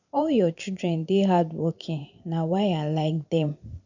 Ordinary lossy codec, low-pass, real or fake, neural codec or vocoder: none; 7.2 kHz; fake; vocoder, 44.1 kHz, 128 mel bands every 512 samples, BigVGAN v2